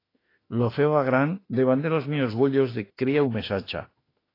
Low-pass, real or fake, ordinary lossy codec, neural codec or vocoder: 5.4 kHz; fake; AAC, 24 kbps; autoencoder, 48 kHz, 32 numbers a frame, DAC-VAE, trained on Japanese speech